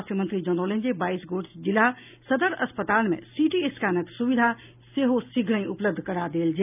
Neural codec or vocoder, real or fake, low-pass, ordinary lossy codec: none; real; 3.6 kHz; none